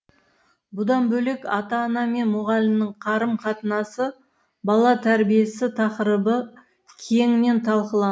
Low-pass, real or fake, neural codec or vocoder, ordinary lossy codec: none; real; none; none